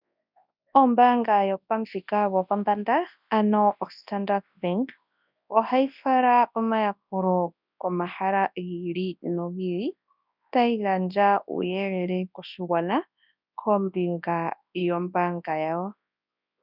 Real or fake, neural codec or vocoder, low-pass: fake; codec, 24 kHz, 0.9 kbps, WavTokenizer, large speech release; 5.4 kHz